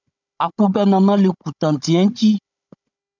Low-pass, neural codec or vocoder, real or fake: 7.2 kHz; codec, 16 kHz, 16 kbps, FunCodec, trained on Chinese and English, 50 frames a second; fake